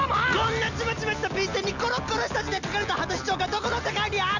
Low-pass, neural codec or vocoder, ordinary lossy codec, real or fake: 7.2 kHz; none; none; real